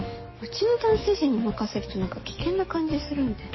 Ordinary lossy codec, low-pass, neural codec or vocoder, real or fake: MP3, 24 kbps; 7.2 kHz; codec, 44.1 kHz, 7.8 kbps, DAC; fake